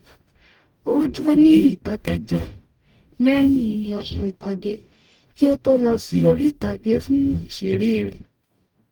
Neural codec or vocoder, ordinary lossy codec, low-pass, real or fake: codec, 44.1 kHz, 0.9 kbps, DAC; Opus, 32 kbps; 19.8 kHz; fake